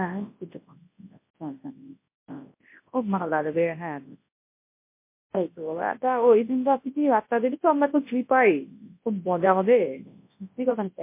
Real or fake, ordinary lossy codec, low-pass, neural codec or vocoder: fake; MP3, 24 kbps; 3.6 kHz; codec, 24 kHz, 0.9 kbps, WavTokenizer, large speech release